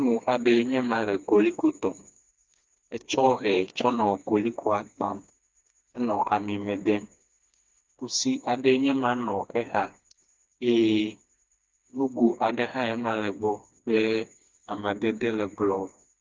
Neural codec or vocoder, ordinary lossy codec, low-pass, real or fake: codec, 16 kHz, 2 kbps, FreqCodec, smaller model; Opus, 24 kbps; 7.2 kHz; fake